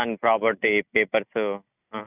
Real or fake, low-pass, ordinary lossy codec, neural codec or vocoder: real; 3.6 kHz; none; none